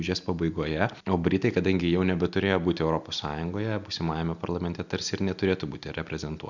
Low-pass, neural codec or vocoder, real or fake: 7.2 kHz; none; real